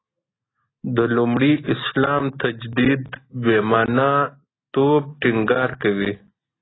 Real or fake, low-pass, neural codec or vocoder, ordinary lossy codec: real; 7.2 kHz; none; AAC, 16 kbps